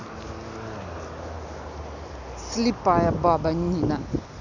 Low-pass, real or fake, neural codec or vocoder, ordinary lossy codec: 7.2 kHz; real; none; none